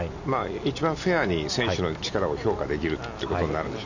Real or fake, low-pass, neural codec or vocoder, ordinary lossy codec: real; 7.2 kHz; none; none